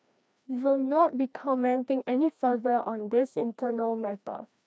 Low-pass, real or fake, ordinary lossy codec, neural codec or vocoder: none; fake; none; codec, 16 kHz, 1 kbps, FreqCodec, larger model